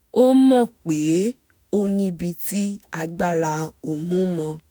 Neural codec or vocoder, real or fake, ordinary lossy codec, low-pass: autoencoder, 48 kHz, 32 numbers a frame, DAC-VAE, trained on Japanese speech; fake; none; none